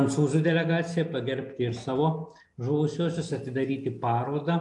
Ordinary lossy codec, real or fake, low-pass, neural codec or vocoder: AAC, 64 kbps; real; 10.8 kHz; none